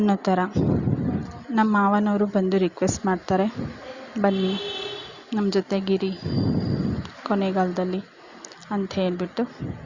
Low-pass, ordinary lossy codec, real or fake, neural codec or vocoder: 7.2 kHz; Opus, 64 kbps; real; none